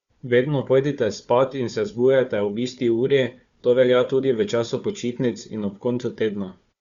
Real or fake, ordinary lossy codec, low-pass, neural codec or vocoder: fake; Opus, 64 kbps; 7.2 kHz; codec, 16 kHz, 4 kbps, FunCodec, trained on Chinese and English, 50 frames a second